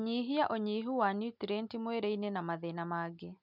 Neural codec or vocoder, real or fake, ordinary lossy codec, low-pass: none; real; none; 5.4 kHz